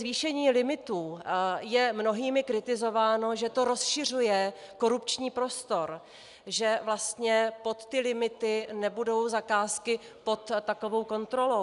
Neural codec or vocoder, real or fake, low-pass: none; real; 10.8 kHz